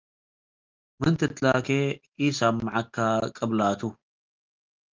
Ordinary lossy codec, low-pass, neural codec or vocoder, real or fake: Opus, 32 kbps; 7.2 kHz; none; real